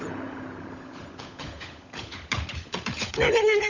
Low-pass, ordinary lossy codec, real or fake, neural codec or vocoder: 7.2 kHz; none; fake; codec, 16 kHz, 16 kbps, FunCodec, trained on LibriTTS, 50 frames a second